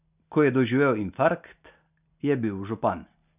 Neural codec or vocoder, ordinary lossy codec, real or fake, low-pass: none; none; real; 3.6 kHz